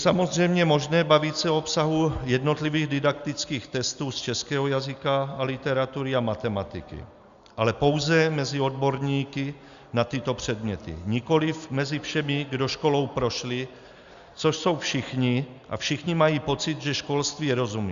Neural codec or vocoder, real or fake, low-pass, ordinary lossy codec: none; real; 7.2 kHz; Opus, 64 kbps